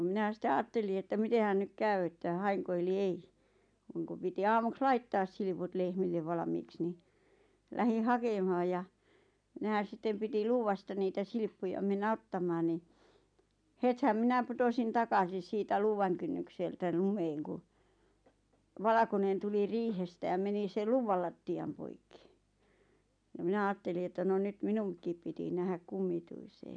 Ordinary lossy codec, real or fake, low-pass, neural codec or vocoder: MP3, 96 kbps; real; 9.9 kHz; none